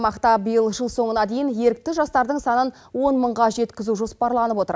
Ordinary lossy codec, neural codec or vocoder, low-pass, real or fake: none; none; none; real